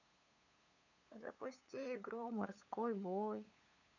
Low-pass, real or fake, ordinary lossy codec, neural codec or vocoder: 7.2 kHz; fake; none; codec, 16 kHz, 8 kbps, FunCodec, trained on LibriTTS, 25 frames a second